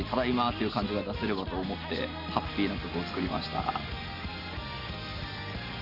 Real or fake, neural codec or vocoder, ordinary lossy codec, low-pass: real; none; Opus, 64 kbps; 5.4 kHz